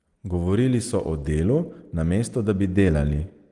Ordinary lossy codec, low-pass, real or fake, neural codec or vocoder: Opus, 24 kbps; 10.8 kHz; real; none